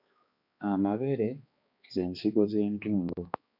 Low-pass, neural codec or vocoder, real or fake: 5.4 kHz; codec, 16 kHz, 2 kbps, X-Codec, HuBERT features, trained on balanced general audio; fake